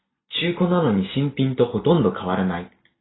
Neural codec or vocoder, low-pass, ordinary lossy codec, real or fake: none; 7.2 kHz; AAC, 16 kbps; real